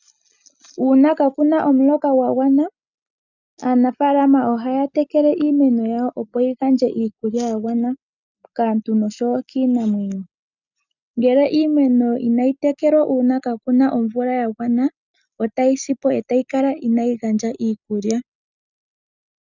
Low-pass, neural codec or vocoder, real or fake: 7.2 kHz; none; real